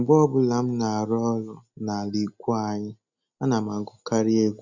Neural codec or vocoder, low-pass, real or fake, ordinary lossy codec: none; 7.2 kHz; real; none